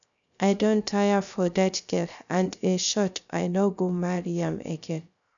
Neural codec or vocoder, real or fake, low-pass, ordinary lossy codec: codec, 16 kHz, 0.3 kbps, FocalCodec; fake; 7.2 kHz; none